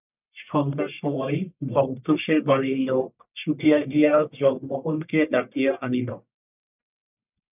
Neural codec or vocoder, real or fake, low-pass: codec, 44.1 kHz, 1.7 kbps, Pupu-Codec; fake; 3.6 kHz